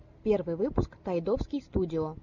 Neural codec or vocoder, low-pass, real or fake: none; 7.2 kHz; real